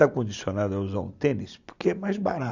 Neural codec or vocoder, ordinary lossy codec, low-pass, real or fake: none; none; 7.2 kHz; real